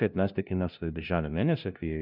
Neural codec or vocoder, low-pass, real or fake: codec, 16 kHz, 0.5 kbps, FunCodec, trained on LibriTTS, 25 frames a second; 5.4 kHz; fake